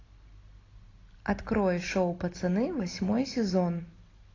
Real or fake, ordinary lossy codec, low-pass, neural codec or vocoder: real; AAC, 32 kbps; 7.2 kHz; none